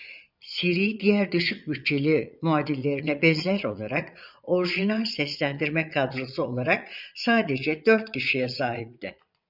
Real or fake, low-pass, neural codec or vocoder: fake; 5.4 kHz; vocoder, 22.05 kHz, 80 mel bands, Vocos